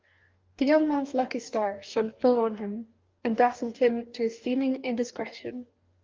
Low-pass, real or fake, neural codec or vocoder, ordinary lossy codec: 7.2 kHz; fake; codec, 44.1 kHz, 2.6 kbps, DAC; Opus, 32 kbps